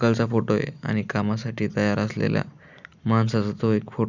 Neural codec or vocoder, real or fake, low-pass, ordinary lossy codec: none; real; 7.2 kHz; none